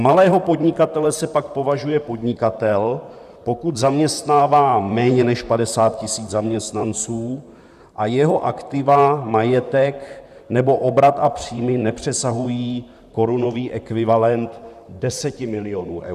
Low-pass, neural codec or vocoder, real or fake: 14.4 kHz; vocoder, 44.1 kHz, 128 mel bands, Pupu-Vocoder; fake